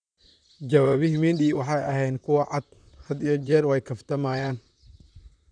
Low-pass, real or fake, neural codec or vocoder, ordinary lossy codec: 9.9 kHz; fake; vocoder, 44.1 kHz, 128 mel bands, Pupu-Vocoder; none